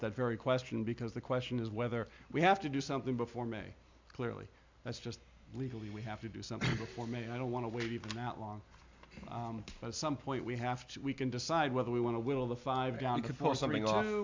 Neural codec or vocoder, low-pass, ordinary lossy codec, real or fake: none; 7.2 kHz; MP3, 64 kbps; real